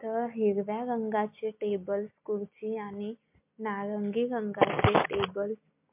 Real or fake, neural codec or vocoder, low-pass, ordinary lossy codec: real; none; 3.6 kHz; MP3, 32 kbps